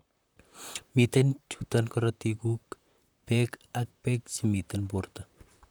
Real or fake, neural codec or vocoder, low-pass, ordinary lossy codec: fake; vocoder, 44.1 kHz, 128 mel bands, Pupu-Vocoder; none; none